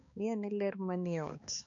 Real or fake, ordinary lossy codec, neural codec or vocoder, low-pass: fake; none; codec, 16 kHz, 2 kbps, X-Codec, HuBERT features, trained on balanced general audio; 7.2 kHz